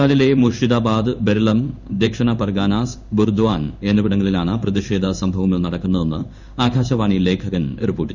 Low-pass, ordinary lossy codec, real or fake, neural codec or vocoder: 7.2 kHz; none; fake; codec, 16 kHz in and 24 kHz out, 1 kbps, XY-Tokenizer